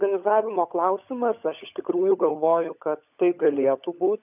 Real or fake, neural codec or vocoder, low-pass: fake; codec, 16 kHz, 16 kbps, FunCodec, trained on LibriTTS, 50 frames a second; 3.6 kHz